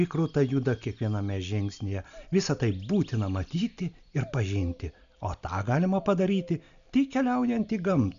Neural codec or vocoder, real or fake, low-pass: none; real; 7.2 kHz